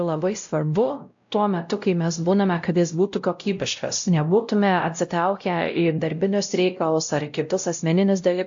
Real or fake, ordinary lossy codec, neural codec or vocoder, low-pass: fake; AAC, 64 kbps; codec, 16 kHz, 0.5 kbps, X-Codec, WavLM features, trained on Multilingual LibriSpeech; 7.2 kHz